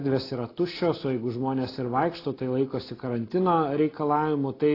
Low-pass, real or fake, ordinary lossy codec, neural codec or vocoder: 5.4 kHz; real; AAC, 24 kbps; none